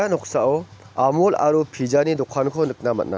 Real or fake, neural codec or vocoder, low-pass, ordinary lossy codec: real; none; none; none